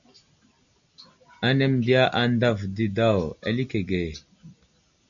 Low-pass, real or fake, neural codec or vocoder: 7.2 kHz; real; none